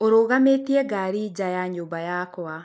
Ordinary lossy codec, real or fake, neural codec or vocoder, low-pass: none; real; none; none